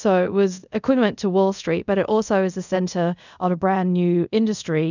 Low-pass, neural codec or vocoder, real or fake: 7.2 kHz; codec, 24 kHz, 0.5 kbps, DualCodec; fake